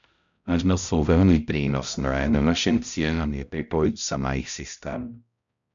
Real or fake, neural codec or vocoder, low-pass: fake; codec, 16 kHz, 0.5 kbps, X-Codec, HuBERT features, trained on balanced general audio; 7.2 kHz